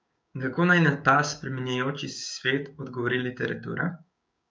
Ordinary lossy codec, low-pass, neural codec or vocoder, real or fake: none; none; codec, 16 kHz, 6 kbps, DAC; fake